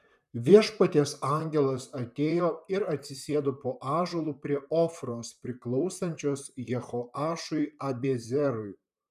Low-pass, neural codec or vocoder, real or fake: 14.4 kHz; vocoder, 44.1 kHz, 128 mel bands, Pupu-Vocoder; fake